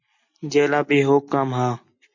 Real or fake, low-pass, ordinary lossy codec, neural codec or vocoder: real; 7.2 kHz; MP3, 48 kbps; none